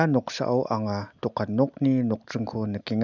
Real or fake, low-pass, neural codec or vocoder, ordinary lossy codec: real; 7.2 kHz; none; none